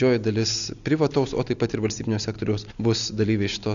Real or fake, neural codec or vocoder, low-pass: real; none; 7.2 kHz